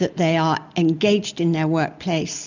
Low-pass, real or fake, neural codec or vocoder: 7.2 kHz; real; none